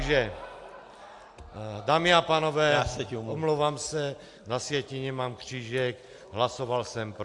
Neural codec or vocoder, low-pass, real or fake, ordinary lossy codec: none; 10.8 kHz; real; AAC, 64 kbps